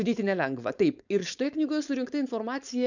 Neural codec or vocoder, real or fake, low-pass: codec, 16 kHz, 4.8 kbps, FACodec; fake; 7.2 kHz